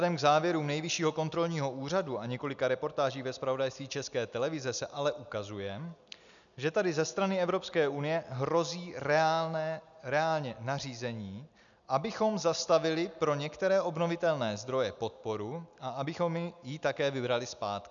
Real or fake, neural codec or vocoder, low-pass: real; none; 7.2 kHz